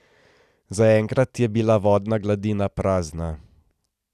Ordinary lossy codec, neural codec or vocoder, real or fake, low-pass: none; none; real; 14.4 kHz